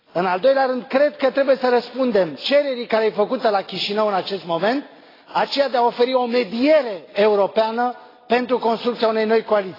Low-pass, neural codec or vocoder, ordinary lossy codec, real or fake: 5.4 kHz; none; AAC, 24 kbps; real